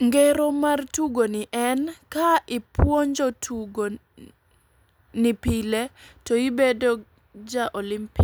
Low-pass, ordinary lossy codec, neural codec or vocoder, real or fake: none; none; none; real